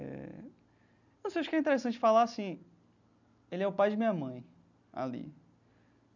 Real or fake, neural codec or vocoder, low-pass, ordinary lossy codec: real; none; 7.2 kHz; none